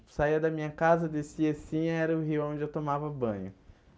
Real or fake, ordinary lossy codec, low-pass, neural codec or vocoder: real; none; none; none